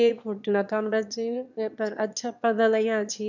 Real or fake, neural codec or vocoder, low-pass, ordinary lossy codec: fake; autoencoder, 22.05 kHz, a latent of 192 numbers a frame, VITS, trained on one speaker; 7.2 kHz; none